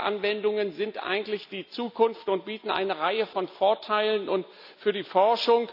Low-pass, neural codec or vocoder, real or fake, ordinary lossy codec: 5.4 kHz; none; real; none